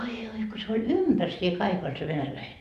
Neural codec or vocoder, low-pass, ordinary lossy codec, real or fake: vocoder, 44.1 kHz, 128 mel bands every 512 samples, BigVGAN v2; 14.4 kHz; none; fake